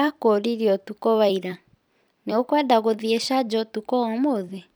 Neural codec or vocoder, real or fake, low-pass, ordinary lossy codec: vocoder, 44.1 kHz, 128 mel bands every 256 samples, BigVGAN v2; fake; none; none